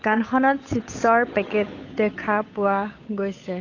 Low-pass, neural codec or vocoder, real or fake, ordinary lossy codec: 7.2 kHz; codec, 16 kHz, 8 kbps, FunCodec, trained on Chinese and English, 25 frames a second; fake; AAC, 32 kbps